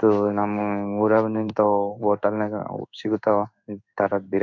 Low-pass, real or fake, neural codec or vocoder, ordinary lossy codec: 7.2 kHz; fake; codec, 16 kHz in and 24 kHz out, 1 kbps, XY-Tokenizer; none